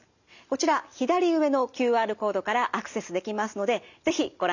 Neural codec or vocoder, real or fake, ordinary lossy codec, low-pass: none; real; none; 7.2 kHz